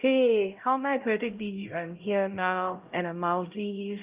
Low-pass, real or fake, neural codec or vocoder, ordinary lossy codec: 3.6 kHz; fake; codec, 16 kHz, 0.5 kbps, X-Codec, HuBERT features, trained on LibriSpeech; Opus, 32 kbps